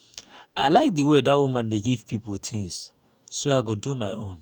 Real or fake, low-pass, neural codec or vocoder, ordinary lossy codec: fake; 19.8 kHz; codec, 44.1 kHz, 2.6 kbps, DAC; none